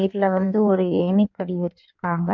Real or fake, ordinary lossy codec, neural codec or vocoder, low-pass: fake; MP3, 48 kbps; codec, 16 kHz in and 24 kHz out, 1.1 kbps, FireRedTTS-2 codec; 7.2 kHz